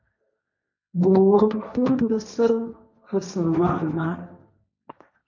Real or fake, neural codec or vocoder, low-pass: fake; codec, 16 kHz, 1.1 kbps, Voila-Tokenizer; 7.2 kHz